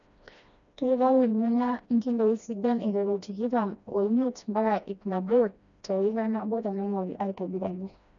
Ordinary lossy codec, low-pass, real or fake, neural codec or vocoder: none; 7.2 kHz; fake; codec, 16 kHz, 1 kbps, FreqCodec, smaller model